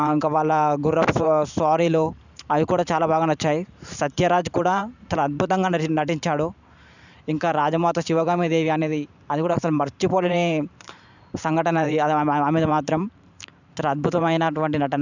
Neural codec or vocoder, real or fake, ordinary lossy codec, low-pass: vocoder, 44.1 kHz, 128 mel bands every 512 samples, BigVGAN v2; fake; none; 7.2 kHz